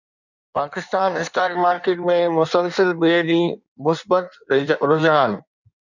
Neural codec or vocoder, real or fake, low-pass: codec, 16 kHz in and 24 kHz out, 1.1 kbps, FireRedTTS-2 codec; fake; 7.2 kHz